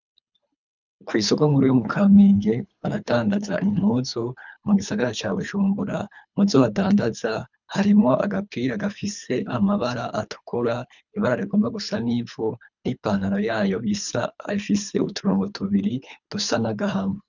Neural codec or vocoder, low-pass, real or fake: codec, 24 kHz, 3 kbps, HILCodec; 7.2 kHz; fake